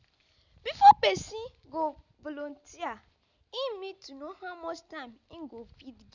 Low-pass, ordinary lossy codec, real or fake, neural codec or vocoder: 7.2 kHz; none; real; none